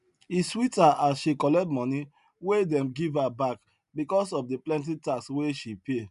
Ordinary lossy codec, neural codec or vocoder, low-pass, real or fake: none; none; 10.8 kHz; real